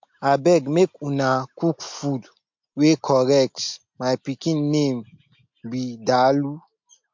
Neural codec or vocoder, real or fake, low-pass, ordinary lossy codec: none; real; 7.2 kHz; MP3, 48 kbps